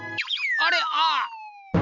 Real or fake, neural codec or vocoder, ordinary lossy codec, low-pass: real; none; none; 7.2 kHz